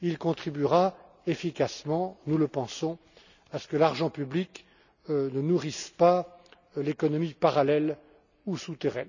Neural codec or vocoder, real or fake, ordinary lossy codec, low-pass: none; real; none; 7.2 kHz